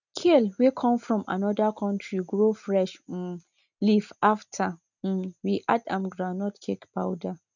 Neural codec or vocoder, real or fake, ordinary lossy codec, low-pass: none; real; none; 7.2 kHz